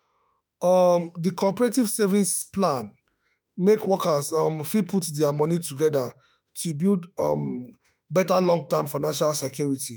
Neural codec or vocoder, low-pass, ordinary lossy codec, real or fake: autoencoder, 48 kHz, 32 numbers a frame, DAC-VAE, trained on Japanese speech; none; none; fake